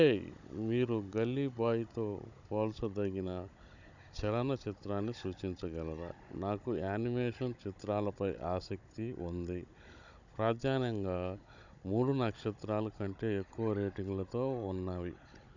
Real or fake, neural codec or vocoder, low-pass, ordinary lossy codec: fake; codec, 16 kHz, 16 kbps, FunCodec, trained on Chinese and English, 50 frames a second; 7.2 kHz; none